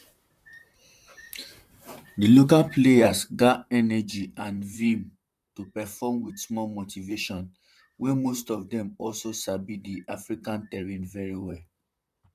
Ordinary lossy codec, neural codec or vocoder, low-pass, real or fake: none; vocoder, 44.1 kHz, 128 mel bands, Pupu-Vocoder; 14.4 kHz; fake